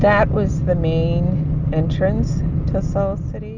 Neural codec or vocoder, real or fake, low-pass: none; real; 7.2 kHz